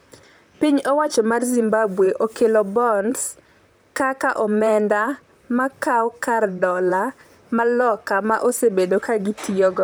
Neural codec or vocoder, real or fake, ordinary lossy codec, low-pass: vocoder, 44.1 kHz, 128 mel bands, Pupu-Vocoder; fake; none; none